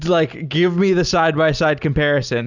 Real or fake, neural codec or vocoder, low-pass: real; none; 7.2 kHz